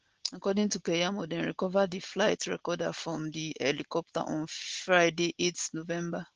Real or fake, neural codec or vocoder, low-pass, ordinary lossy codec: real; none; 7.2 kHz; Opus, 16 kbps